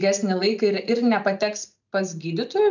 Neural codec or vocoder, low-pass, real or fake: none; 7.2 kHz; real